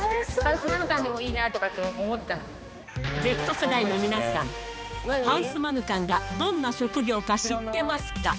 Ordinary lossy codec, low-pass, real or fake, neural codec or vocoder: none; none; fake; codec, 16 kHz, 2 kbps, X-Codec, HuBERT features, trained on balanced general audio